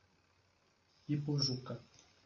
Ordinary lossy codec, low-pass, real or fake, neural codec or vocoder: MP3, 32 kbps; 7.2 kHz; real; none